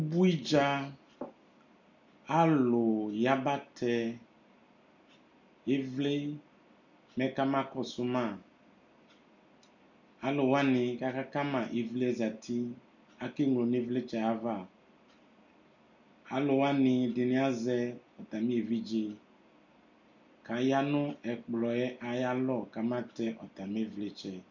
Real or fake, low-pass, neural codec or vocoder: real; 7.2 kHz; none